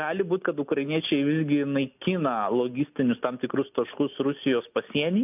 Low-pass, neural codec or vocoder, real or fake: 3.6 kHz; none; real